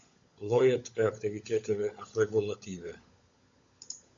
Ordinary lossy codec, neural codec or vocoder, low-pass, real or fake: MP3, 64 kbps; codec, 16 kHz, 16 kbps, FunCodec, trained on LibriTTS, 50 frames a second; 7.2 kHz; fake